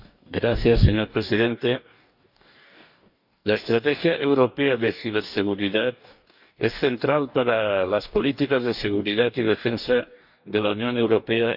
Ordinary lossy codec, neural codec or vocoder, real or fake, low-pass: none; codec, 44.1 kHz, 2.6 kbps, DAC; fake; 5.4 kHz